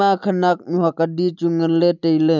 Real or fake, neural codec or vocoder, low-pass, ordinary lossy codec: real; none; 7.2 kHz; none